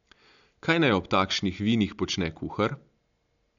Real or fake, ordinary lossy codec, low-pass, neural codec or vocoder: real; none; 7.2 kHz; none